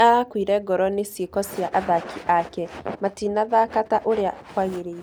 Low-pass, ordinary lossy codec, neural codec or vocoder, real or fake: none; none; none; real